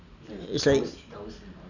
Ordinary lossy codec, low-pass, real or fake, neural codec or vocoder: none; 7.2 kHz; real; none